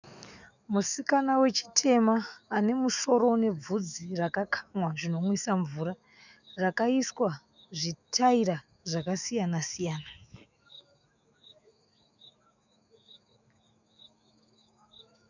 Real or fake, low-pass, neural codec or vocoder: fake; 7.2 kHz; autoencoder, 48 kHz, 128 numbers a frame, DAC-VAE, trained on Japanese speech